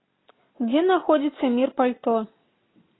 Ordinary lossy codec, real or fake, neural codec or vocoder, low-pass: AAC, 16 kbps; real; none; 7.2 kHz